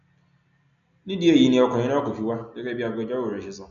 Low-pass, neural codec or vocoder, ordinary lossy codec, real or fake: 7.2 kHz; none; none; real